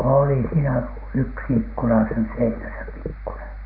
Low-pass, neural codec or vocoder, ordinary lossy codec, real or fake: 5.4 kHz; none; none; real